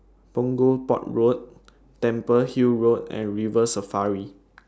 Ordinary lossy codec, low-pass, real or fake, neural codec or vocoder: none; none; real; none